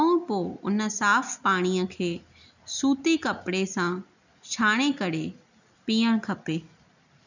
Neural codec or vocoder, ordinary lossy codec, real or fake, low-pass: none; none; real; 7.2 kHz